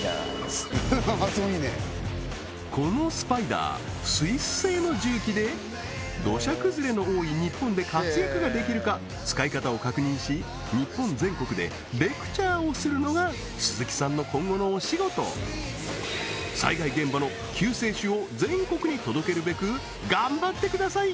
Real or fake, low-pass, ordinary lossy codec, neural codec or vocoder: real; none; none; none